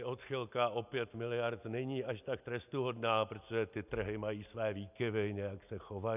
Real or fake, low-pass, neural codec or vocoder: real; 3.6 kHz; none